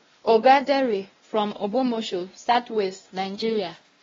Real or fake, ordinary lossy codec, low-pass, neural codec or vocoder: fake; AAC, 24 kbps; 7.2 kHz; codec, 16 kHz, 1 kbps, X-Codec, WavLM features, trained on Multilingual LibriSpeech